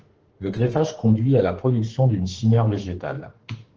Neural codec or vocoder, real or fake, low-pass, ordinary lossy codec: autoencoder, 48 kHz, 32 numbers a frame, DAC-VAE, trained on Japanese speech; fake; 7.2 kHz; Opus, 16 kbps